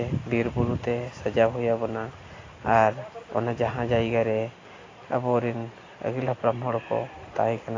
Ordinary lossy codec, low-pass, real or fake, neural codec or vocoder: AAC, 32 kbps; 7.2 kHz; real; none